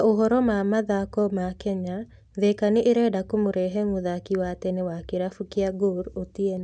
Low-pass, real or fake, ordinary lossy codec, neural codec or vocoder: 9.9 kHz; real; none; none